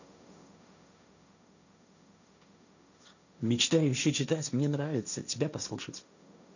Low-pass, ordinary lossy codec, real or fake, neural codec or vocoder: 7.2 kHz; none; fake; codec, 16 kHz, 1.1 kbps, Voila-Tokenizer